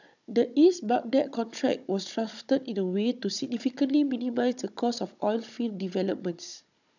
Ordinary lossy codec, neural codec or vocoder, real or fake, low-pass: none; codec, 16 kHz, 16 kbps, FunCodec, trained on Chinese and English, 50 frames a second; fake; 7.2 kHz